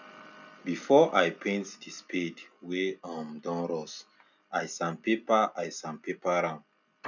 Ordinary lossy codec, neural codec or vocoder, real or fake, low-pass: none; none; real; 7.2 kHz